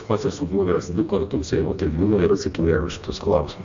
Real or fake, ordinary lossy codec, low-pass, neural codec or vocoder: fake; AAC, 64 kbps; 7.2 kHz; codec, 16 kHz, 1 kbps, FreqCodec, smaller model